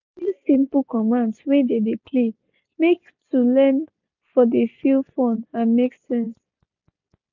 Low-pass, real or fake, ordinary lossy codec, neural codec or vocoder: 7.2 kHz; real; none; none